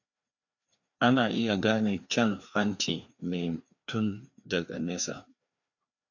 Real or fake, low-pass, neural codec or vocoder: fake; 7.2 kHz; codec, 16 kHz, 2 kbps, FreqCodec, larger model